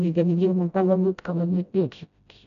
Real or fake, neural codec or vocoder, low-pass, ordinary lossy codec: fake; codec, 16 kHz, 0.5 kbps, FreqCodec, smaller model; 7.2 kHz; none